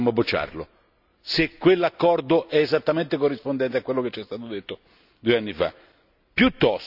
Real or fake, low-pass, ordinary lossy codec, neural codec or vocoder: real; 5.4 kHz; none; none